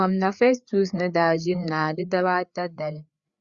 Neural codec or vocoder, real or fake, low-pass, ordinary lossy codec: codec, 16 kHz, 4 kbps, FreqCodec, larger model; fake; 7.2 kHz; Opus, 64 kbps